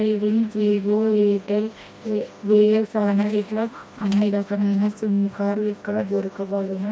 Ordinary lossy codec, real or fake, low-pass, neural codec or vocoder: none; fake; none; codec, 16 kHz, 1 kbps, FreqCodec, smaller model